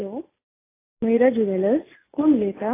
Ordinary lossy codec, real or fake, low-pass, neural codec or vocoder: AAC, 16 kbps; real; 3.6 kHz; none